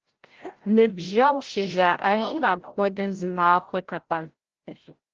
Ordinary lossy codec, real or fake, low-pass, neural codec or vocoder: Opus, 16 kbps; fake; 7.2 kHz; codec, 16 kHz, 0.5 kbps, FreqCodec, larger model